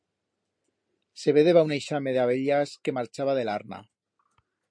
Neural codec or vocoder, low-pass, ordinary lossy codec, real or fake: none; 9.9 kHz; MP3, 48 kbps; real